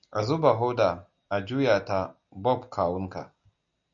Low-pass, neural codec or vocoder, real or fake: 7.2 kHz; none; real